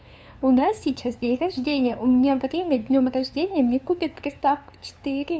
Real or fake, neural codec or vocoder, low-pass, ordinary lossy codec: fake; codec, 16 kHz, 2 kbps, FunCodec, trained on LibriTTS, 25 frames a second; none; none